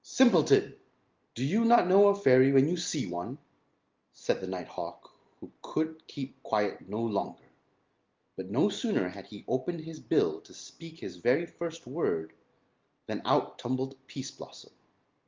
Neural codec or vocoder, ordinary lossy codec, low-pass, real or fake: none; Opus, 32 kbps; 7.2 kHz; real